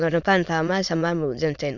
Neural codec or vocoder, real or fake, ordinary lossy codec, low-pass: autoencoder, 22.05 kHz, a latent of 192 numbers a frame, VITS, trained on many speakers; fake; none; 7.2 kHz